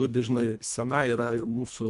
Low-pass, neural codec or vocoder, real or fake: 10.8 kHz; codec, 24 kHz, 1.5 kbps, HILCodec; fake